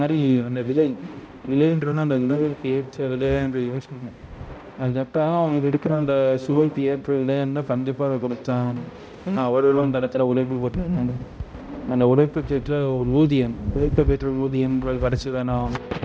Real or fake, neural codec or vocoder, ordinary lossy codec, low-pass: fake; codec, 16 kHz, 0.5 kbps, X-Codec, HuBERT features, trained on balanced general audio; none; none